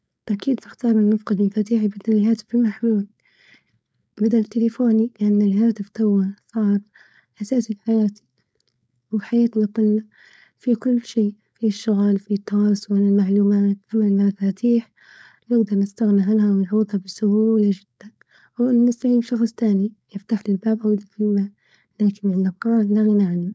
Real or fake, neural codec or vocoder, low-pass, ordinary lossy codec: fake; codec, 16 kHz, 4.8 kbps, FACodec; none; none